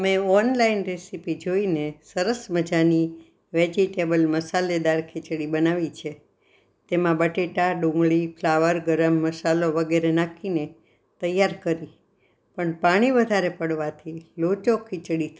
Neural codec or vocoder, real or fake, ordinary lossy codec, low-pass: none; real; none; none